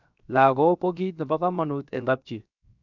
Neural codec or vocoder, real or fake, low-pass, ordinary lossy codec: codec, 16 kHz, 0.7 kbps, FocalCodec; fake; 7.2 kHz; none